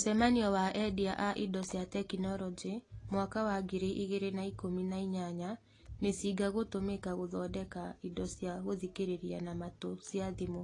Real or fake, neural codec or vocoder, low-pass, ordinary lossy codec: real; none; 10.8 kHz; AAC, 32 kbps